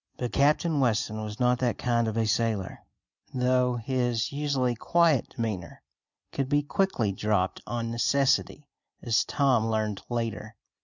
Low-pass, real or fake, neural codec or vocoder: 7.2 kHz; real; none